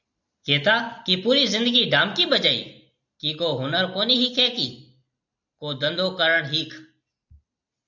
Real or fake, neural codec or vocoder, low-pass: real; none; 7.2 kHz